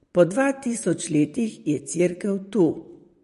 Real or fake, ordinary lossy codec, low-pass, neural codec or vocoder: fake; MP3, 48 kbps; 14.4 kHz; vocoder, 44.1 kHz, 128 mel bands, Pupu-Vocoder